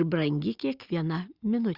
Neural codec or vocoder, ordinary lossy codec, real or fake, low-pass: none; Opus, 64 kbps; real; 5.4 kHz